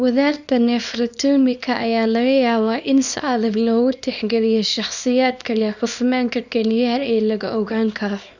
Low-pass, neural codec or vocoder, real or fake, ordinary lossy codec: 7.2 kHz; codec, 24 kHz, 0.9 kbps, WavTokenizer, small release; fake; none